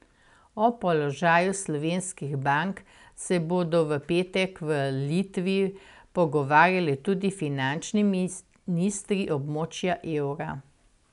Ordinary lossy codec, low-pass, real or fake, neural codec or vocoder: none; 10.8 kHz; real; none